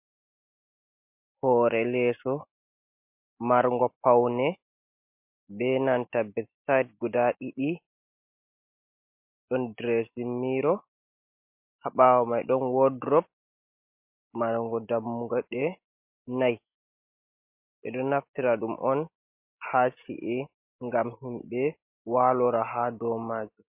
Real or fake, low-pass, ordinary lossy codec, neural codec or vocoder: real; 3.6 kHz; MP3, 32 kbps; none